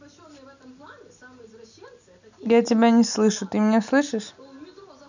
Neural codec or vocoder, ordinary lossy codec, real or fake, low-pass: none; none; real; 7.2 kHz